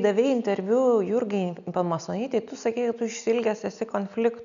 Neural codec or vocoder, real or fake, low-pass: none; real; 7.2 kHz